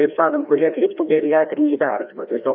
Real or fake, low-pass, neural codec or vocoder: fake; 5.4 kHz; codec, 16 kHz, 1 kbps, FreqCodec, larger model